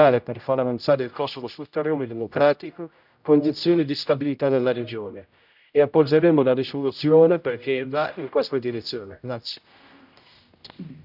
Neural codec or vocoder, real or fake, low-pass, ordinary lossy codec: codec, 16 kHz, 0.5 kbps, X-Codec, HuBERT features, trained on general audio; fake; 5.4 kHz; none